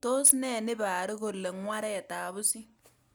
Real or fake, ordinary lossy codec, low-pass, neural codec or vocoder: real; none; none; none